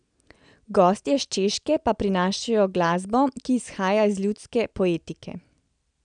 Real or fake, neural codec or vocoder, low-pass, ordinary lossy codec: real; none; 9.9 kHz; none